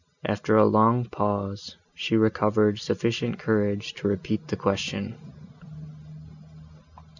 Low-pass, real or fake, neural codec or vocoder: 7.2 kHz; real; none